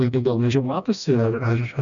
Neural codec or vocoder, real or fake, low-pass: codec, 16 kHz, 1 kbps, FreqCodec, smaller model; fake; 7.2 kHz